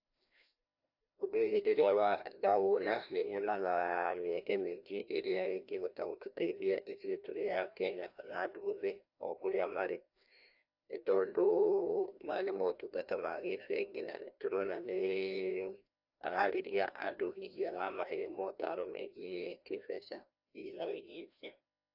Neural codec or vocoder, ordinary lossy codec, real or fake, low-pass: codec, 16 kHz, 1 kbps, FreqCodec, larger model; AAC, 48 kbps; fake; 5.4 kHz